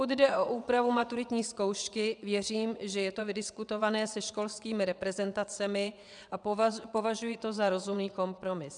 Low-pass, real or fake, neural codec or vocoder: 9.9 kHz; fake; vocoder, 22.05 kHz, 80 mel bands, WaveNeXt